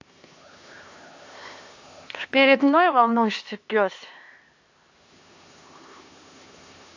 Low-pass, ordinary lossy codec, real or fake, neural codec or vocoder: 7.2 kHz; AAC, 48 kbps; fake; codec, 16 kHz, 2 kbps, X-Codec, HuBERT features, trained on LibriSpeech